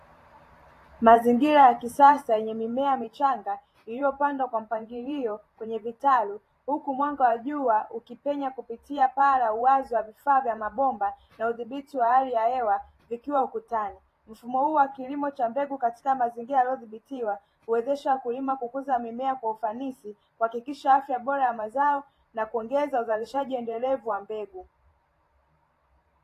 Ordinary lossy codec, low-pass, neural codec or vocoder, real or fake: AAC, 48 kbps; 14.4 kHz; vocoder, 44.1 kHz, 128 mel bands every 512 samples, BigVGAN v2; fake